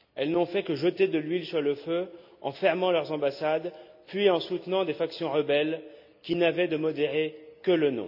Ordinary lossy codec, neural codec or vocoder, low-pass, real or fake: none; none; 5.4 kHz; real